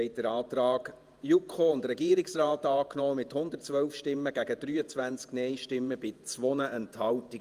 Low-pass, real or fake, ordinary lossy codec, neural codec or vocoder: 14.4 kHz; fake; Opus, 32 kbps; vocoder, 48 kHz, 128 mel bands, Vocos